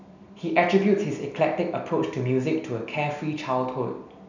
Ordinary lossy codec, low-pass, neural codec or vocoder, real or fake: none; 7.2 kHz; none; real